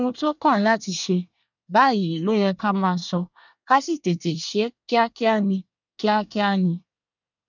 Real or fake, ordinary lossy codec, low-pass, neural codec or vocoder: fake; none; 7.2 kHz; codec, 24 kHz, 1 kbps, SNAC